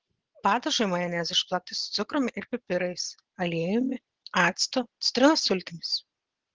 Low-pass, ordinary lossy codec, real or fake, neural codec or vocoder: 7.2 kHz; Opus, 16 kbps; real; none